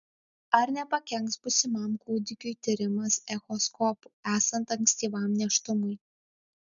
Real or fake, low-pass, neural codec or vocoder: real; 7.2 kHz; none